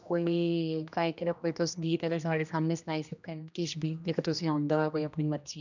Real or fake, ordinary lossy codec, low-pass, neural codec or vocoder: fake; none; 7.2 kHz; codec, 16 kHz, 1 kbps, X-Codec, HuBERT features, trained on general audio